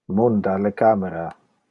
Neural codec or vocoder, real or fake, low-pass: none; real; 10.8 kHz